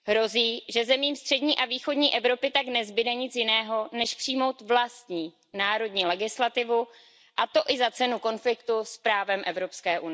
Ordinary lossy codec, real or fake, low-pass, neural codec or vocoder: none; real; none; none